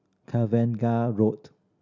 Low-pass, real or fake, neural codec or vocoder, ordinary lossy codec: 7.2 kHz; real; none; none